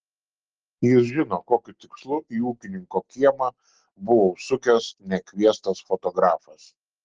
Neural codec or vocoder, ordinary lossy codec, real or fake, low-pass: none; Opus, 16 kbps; real; 7.2 kHz